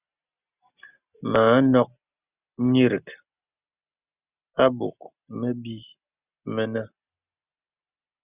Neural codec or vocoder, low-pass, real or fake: none; 3.6 kHz; real